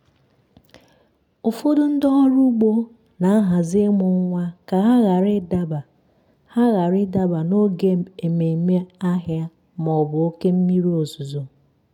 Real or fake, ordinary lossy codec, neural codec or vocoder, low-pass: real; none; none; 19.8 kHz